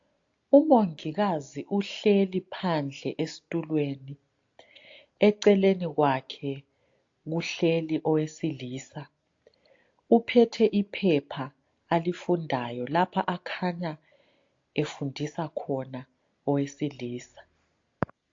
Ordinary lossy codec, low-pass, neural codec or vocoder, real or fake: AAC, 48 kbps; 7.2 kHz; none; real